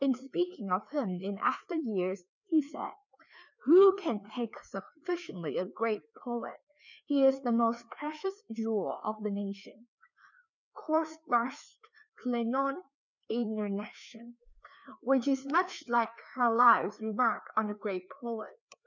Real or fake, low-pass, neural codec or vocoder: fake; 7.2 kHz; codec, 16 kHz, 2 kbps, FreqCodec, larger model